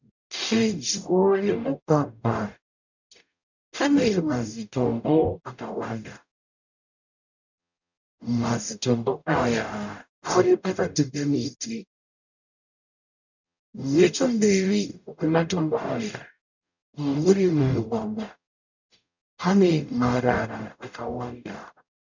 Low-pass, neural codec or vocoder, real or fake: 7.2 kHz; codec, 44.1 kHz, 0.9 kbps, DAC; fake